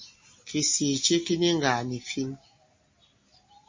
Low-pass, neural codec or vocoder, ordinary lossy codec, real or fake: 7.2 kHz; none; MP3, 32 kbps; real